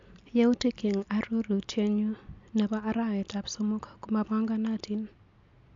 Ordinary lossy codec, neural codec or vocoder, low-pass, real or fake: MP3, 64 kbps; none; 7.2 kHz; real